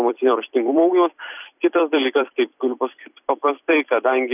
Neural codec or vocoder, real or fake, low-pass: vocoder, 44.1 kHz, 128 mel bands every 256 samples, BigVGAN v2; fake; 3.6 kHz